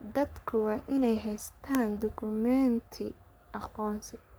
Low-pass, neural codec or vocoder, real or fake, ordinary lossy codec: none; codec, 44.1 kHz, 3.4 kbps, Pupu-Codec; fake; none